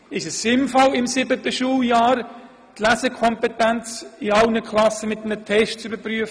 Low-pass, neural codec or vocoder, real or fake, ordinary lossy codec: none; none; real; none